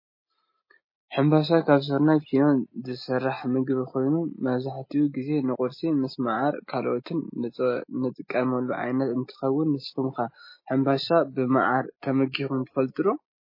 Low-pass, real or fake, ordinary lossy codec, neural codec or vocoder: 5.4 kHz; real; MP3, 24 kbps; none